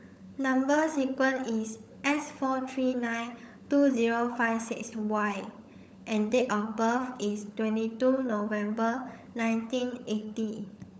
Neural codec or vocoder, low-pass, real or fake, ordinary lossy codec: codec, 16 kHz, 16 kbps, FunCodec, trained on LibriTTS, 50 frames a second; none; fake; none